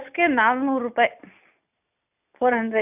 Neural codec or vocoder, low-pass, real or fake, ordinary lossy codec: none; 3.6 kHz; real; none